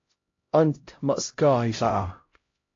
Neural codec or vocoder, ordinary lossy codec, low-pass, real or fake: codec, 16 kHz, 0.5 kbps, X-Codec, HuBERT features, trained on LibriSpeech; AAC, 32 kbps; 7.2 kHz; fake